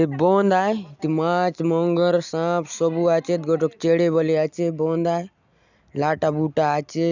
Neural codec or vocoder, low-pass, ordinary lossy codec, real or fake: none; 7.2 kHz; none; real